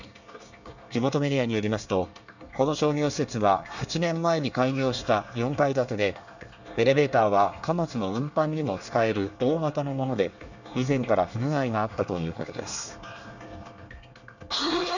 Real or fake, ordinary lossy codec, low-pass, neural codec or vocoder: fake; none; 7.2 kHz; codec, 24 kHz, 1 kbps, SNAC